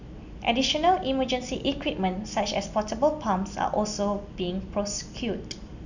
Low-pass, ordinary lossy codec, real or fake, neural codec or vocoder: 7.2 kHz; none; real; none